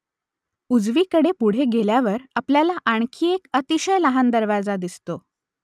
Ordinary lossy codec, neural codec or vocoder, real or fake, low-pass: none; none; real; none